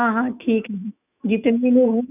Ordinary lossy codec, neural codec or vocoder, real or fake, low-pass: none; autoencoder, 48 kHz, 128 numbers a frame, DAC-VAE, trained on Japanese speech; fake; 3.6 kHz